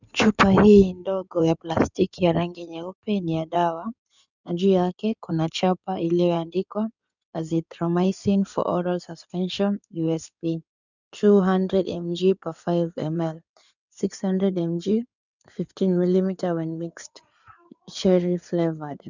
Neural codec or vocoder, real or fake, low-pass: codec, 16 kHz in and 24 kHz out, 2.2 kbps, FireRedTTS-2 codec; fake; 7.2 kHz